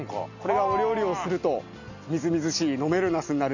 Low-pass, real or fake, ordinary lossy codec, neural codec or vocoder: 7.2 kHz; real; none; none